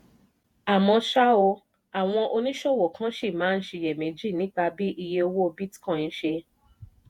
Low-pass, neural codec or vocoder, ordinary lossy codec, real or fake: 19.8 kHz; vocoder, 48 kHz, 128 mel bands, Vocos; MP3, 96 kbps; fake